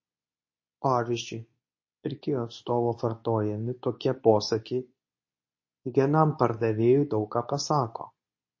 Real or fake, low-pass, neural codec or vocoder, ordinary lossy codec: fake; 7.2 kHz; codec, 24 kHz, 0.9 kbps, WavTokenizer, medium speech release version 2; MP3, 32 kbps